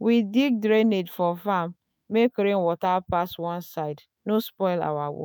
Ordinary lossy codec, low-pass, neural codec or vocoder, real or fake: none; none; autoencoder, 48 kHz, 128 numbers a frame, DAC-VAE, trained on Japanese speech; fake